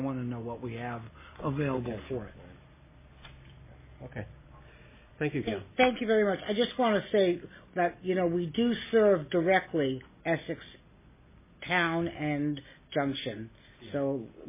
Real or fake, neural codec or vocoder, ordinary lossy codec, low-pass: real; none; MP3, 16 kbps; 3.6 kHz